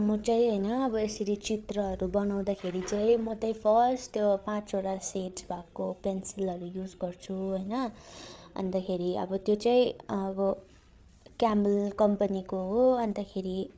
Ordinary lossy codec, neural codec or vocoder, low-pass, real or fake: none; codec, 16 kHz, 8 kbps, FreqCodec, larger model; none; fake